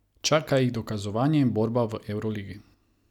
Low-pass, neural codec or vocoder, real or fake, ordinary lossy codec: 19.8 kHz; none; real; none